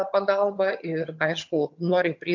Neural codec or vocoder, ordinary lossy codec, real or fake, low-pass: vocoder, 22.05 kHz, 80 mel bands, HiFi-GAN; MP3, 48 kbps; fake; 7.2 kHz